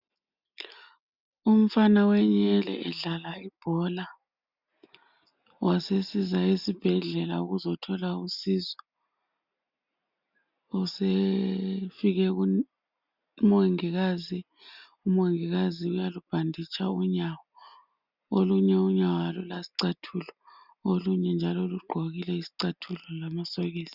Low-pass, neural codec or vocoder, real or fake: 5.4 kHz; none; real